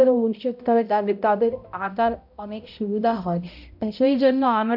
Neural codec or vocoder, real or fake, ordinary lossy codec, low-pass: codec, 16 kHz, 0.5 kbps, X-Codec, HuBERT features, trained on balanced general audio; fake; AAC, 48 kbps; 5.4 kHz